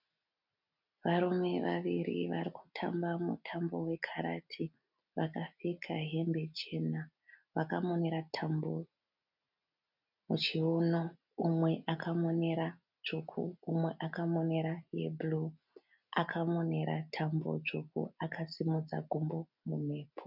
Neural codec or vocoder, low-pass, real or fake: none; 5.4 kHz; real